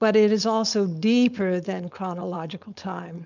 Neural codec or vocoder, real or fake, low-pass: codec, 16 kHz, 4.8 kbps, FACodec; fake; 7.2 kHz